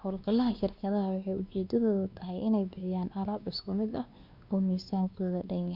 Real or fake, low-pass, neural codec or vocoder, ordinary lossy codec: fake; 5.4 kHz; codec, 16 kHz, 2 kbps, X-Codec, WavLM features, trained on Multilingual LibriSpeech; Opus, 64 kbps